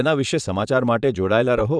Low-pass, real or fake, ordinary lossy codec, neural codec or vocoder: 9.9 kHz; fake; none; vocoder, 22.05 kHz, 80 mel bands, Vocos